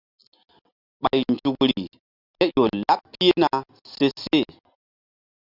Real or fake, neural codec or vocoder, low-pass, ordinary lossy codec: real; none; 5.4 kHz; Opus, 64 kbps